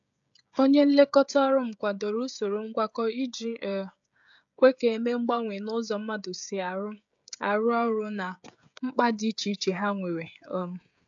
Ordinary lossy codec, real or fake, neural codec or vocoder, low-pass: AAC, 64 kbps; fake; codec, 16 kHz, 16 kbps, FreqCodec, smaller model; 7.2 kHz